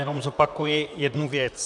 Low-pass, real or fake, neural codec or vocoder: 10.8 kHz; fake; vocoder, 44.1 kHz, 128 mel bands, Pupu-Vocoder